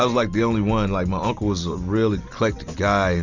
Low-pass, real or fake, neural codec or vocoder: 7.2 kHz; real; none